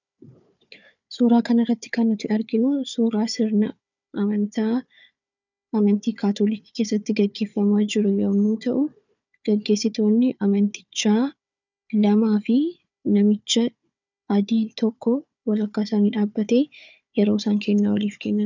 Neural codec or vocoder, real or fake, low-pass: codec, 16 kHz, 4 kbps, FunCodec, trained on Chinese and English, 50 frames a second; fake; 7.2 kHz